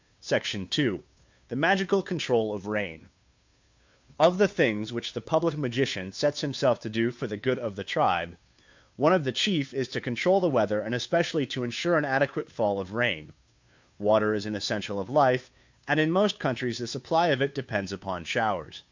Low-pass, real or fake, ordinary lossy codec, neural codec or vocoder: 7.2 kHz; fake; MP3, 64 kbps; codec, 16 kHz, 2 kbps, FunCodec, trained on Chinese and English, 25 frames a second